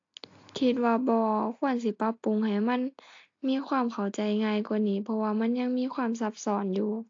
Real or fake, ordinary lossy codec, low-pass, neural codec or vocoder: real; AAC, 48 kbps; 7.2 kHz; none